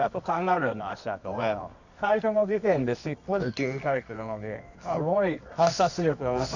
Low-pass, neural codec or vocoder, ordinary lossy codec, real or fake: 7.2 kHz; codec, 24 kHz, 0.9 kbps, WavTokenizer, medium music audio release; none; fake